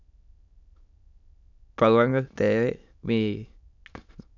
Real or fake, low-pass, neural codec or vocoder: fake; 7.2 kHz; autoencoder, 22.05 kHz, a latent of 192 numbers a frame, VITS, trained on many speakers